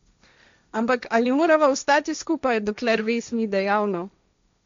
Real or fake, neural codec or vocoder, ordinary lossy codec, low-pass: fake; codec, 16 kHz, 1.1 kbps, Voila-Tokenizer; MP3, 64 kbps; 7.2 kHz